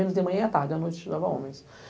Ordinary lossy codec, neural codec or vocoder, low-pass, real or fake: none; none; none; real